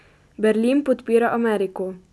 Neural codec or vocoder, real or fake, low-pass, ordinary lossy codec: none; real; none; none